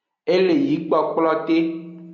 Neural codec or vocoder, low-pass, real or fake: none; 7.2 kHz; real